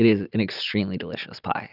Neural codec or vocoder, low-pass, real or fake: none; 5.4 kHz; real